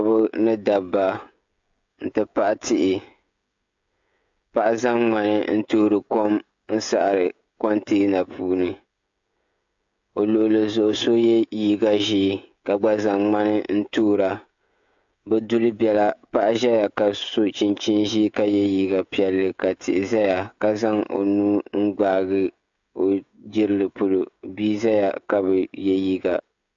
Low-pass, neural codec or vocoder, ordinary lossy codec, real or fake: 7.2 kHz; codec, 16 kHz, 16 kbps, FreqCodec, smaller model; AAC, 64 kbps; fake